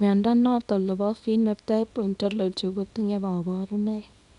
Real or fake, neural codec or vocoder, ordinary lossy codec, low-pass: fake; codec, 24 kHz, 0.9 kbps, WavTokenizer, small release; none; 10.8 kHz